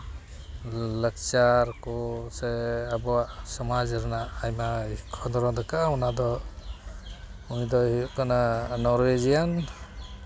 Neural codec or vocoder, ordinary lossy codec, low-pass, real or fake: none; none; none; real